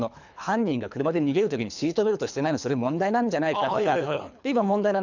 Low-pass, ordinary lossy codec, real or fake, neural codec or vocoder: 7.2 kHz; none; fake; codec, 24 kHz, 3 kbps, HILCodec